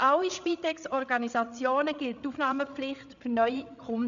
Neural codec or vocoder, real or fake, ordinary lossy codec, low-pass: codec, 16 kHz, 8 kbps, FreqCodec, larger model; fake; MP3, 96 kbps; 7.2 kHz